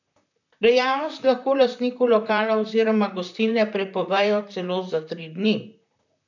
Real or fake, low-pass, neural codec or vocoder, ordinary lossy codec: fake; 7.2 kHz; vocoder, 44.1 kHz, 128 mel bands, Pupu-Vocoder; none